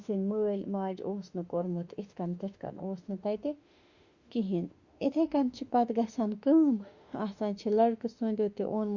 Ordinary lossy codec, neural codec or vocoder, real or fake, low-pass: Opus, 64 kbps; autoencoder, 48 kHz, 32 numbers a frame, DAC-VAE, trained on Japanese speech; fake; 7.2 kHz